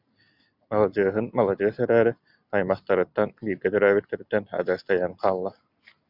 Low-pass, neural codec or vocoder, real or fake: 5.4 kHz; none; real